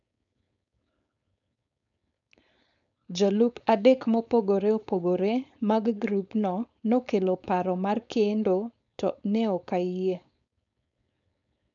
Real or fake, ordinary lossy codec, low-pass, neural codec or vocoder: fake; none; 7.2 kHz; codec, 16 kHz, 4.8 kbps, FACodec